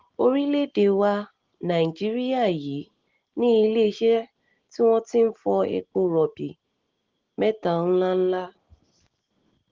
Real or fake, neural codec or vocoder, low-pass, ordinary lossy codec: real; none; 7.2 kHz; Opus, 16 kbps